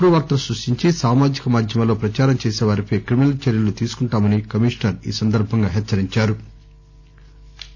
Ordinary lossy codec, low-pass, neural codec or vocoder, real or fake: none; none; none; real